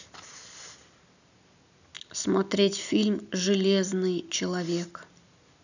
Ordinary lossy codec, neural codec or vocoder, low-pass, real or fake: none; none; 7.2 kHz; real